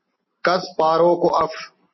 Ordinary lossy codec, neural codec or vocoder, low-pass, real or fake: MP3, 24 kbps; none; 7.2 kHz; real